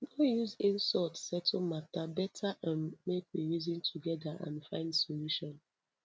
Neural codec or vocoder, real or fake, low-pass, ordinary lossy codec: none; real; none; none